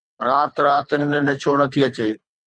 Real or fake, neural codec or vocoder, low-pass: fake; codec, 24 kHz, 3 kbps, HILCodec; 9.9 kHz